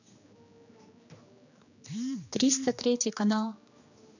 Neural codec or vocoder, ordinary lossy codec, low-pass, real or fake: codec, 16 kHz, 2 kbps, X-Codec, HuBERT features, trained on general audio; none; 7.2 kHz; fake